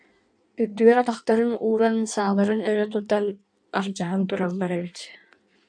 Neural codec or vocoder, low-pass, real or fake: codec, 16 kHz in and 24 kHz out, 1.1 kbps, FireRedTTS-2 codec; 9.9 kHz; fake